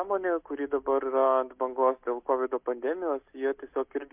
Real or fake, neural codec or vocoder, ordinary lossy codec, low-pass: real; none; MP3, 32 kbps; 3.6 kHz